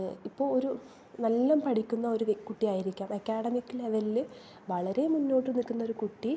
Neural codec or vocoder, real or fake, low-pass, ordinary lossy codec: none; real; none; none